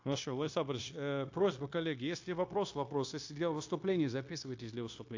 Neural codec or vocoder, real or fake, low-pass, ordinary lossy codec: codec, 16 kHz, 0.9 kbps, LongCat-Audio-Codec; fake; 7.2 kHz; AAC, 48 kbps